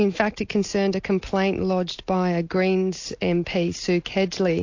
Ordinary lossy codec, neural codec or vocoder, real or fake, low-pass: AAC, 48 kbps; none; real; 7.2 kHz